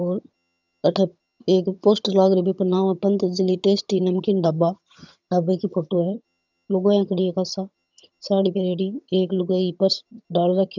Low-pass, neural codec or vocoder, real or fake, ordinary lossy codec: 7.2 kHz; vocoder, 22.05 kHz, 80 mel bands, HiFi-GAN; fake; none